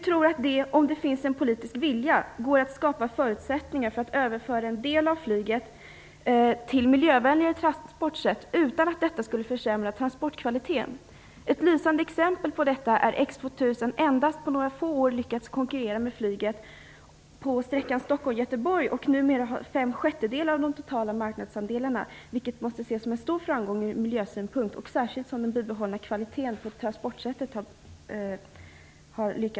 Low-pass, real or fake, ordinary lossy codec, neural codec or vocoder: none; real; none; none